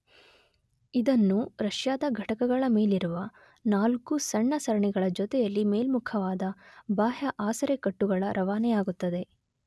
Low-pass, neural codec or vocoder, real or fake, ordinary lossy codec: none; none; real; none